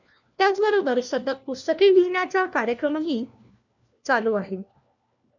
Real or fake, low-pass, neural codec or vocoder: fake; 7.2 kHz; codec, 16 kHz, 1 kbps, FunCodec, trained on LibriTTS, 50 frames a second